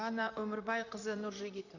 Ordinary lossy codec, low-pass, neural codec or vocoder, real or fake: none; 7.2 kHz; vocoder, 44.1 kHz, 128 mel bands, Pupu-Vocoder; fake